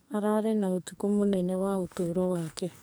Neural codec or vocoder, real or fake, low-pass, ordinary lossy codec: codec, 44.1 kHz, 2.6 kbps, SNAC; fake; none; none